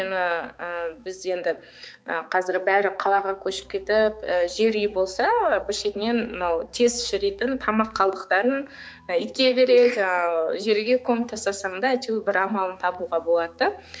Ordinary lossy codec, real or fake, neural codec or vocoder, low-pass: none; fake; codec, 16 kHz, 4 kbps, X-Codec, HuBERT features, trained on balanced general audio; none